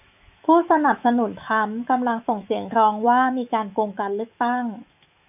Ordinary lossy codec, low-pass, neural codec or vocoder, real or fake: AAC, 32 kbps; 3.6 kHz; autoencoder, 48 kHz, 128 numbers a frame, DAC-VAE, trained on Japanese speech; fake